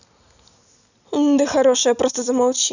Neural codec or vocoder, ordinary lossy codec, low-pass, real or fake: none; none; 7.2 kHz; real